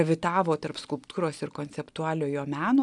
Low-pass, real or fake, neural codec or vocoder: 10.8 kHz; real; none